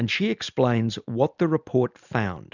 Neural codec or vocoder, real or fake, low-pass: none; real; 7.2 kHz